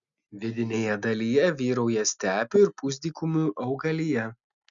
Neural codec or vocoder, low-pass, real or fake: none; 7.2 kHz; real